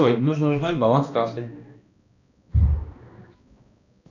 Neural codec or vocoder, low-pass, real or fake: codec, 16 kHz, 1 kbps, X-Codec, HuBERT features, trained on balanced general audio; 7.2 kHz; fake